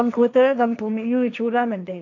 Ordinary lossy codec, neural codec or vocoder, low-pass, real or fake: none; codec, 16 kHz, 1.1 kbps, Voila-Tokenizer; 7.2 kHz; fake